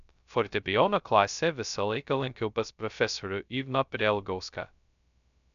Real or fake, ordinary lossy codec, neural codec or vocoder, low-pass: fake; MP3, 96 kbps; codec, 16 kHz, 0.2 kbps, FocalCodec; 7.2 kHz